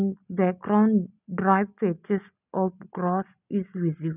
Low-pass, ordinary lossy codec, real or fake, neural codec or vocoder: 3.6 kHz; none; real; none